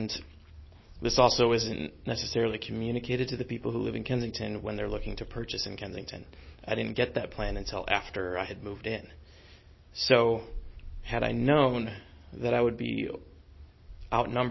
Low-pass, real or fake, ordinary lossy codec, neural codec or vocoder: 7.2 kHz; real; MP3, 24 kbps; none